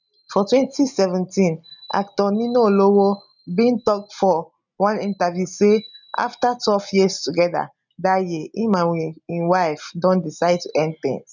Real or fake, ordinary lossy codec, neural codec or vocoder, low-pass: real; none; none; 7.2 kHz